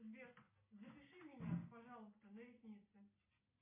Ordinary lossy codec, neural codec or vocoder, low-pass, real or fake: MP3, 24 kbps; none; 3.6 kHz; real